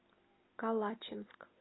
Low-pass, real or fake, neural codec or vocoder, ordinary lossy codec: 7.2 kHz; real; none; AAC, 16 kbps